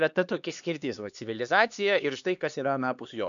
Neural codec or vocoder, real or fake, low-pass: codec, 16 kHz, 2 kbps, X-Codec, HuBERT features, trained on LibriSpeech; fake; 7.2 kHz